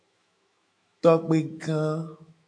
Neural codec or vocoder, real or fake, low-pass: autoencoder, 48 kHz, 128 numbers a frame, DAC-VAE, trained on Japanese speech; fake; 9.9 kHz